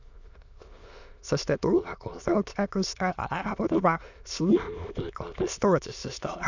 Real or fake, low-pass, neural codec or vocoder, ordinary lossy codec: fake; 7.2 kHz; autoencoder, 22.05 kHz, a latent of 192 numbers a frame, VITS, trained on many speakers; none